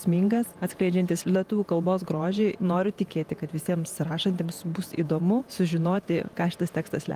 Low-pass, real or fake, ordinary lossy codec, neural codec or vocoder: 14.4 kHz; fake; Opus, 32 kbps; vocoder, 48 kHz, 128 mel bands, Vocos